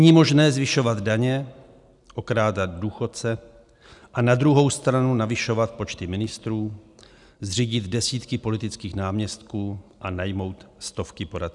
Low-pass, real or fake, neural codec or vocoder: 10.8 kHz; real; none